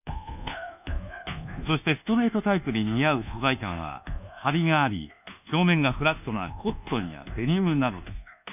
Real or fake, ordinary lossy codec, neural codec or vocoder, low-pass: fake; none; codec, 24 kHz, 1.2 kbps, DualCodec; 3.6 kHz